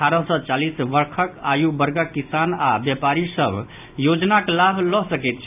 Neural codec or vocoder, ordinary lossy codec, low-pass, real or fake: none; AAC, 32 kbps; 3.6 kHz; real